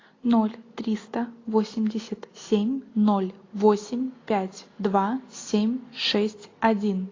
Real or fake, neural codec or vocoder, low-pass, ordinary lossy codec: real; none; 7.2 kHz; AAC, 32 kbps